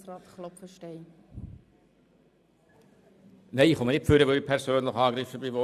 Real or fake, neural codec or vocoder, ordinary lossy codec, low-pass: fake; vocoder, 44.1 kHz, 128 mel bands every 512 samples, BigVGAN v2; none; 14.4 kHz